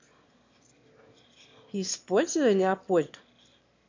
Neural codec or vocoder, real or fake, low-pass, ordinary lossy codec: autoencoder, 22.05 kHz, a latent of 192 numbers a frame, VITS, trained on one speaker; fake; 7.2 kHz; MP3, 48 kbps